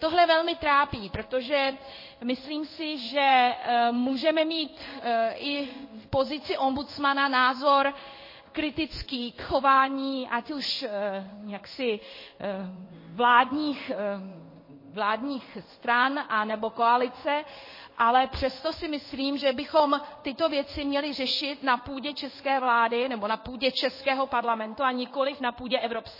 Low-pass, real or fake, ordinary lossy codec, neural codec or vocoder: 5.4 kHz; fake; MP3, 24 kbps; codec, 16 kHz in and 24 kHz out, 1 kbps, XY-Tokenizer